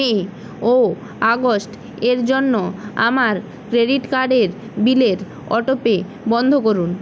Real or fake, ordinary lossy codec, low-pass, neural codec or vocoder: real; none; none; none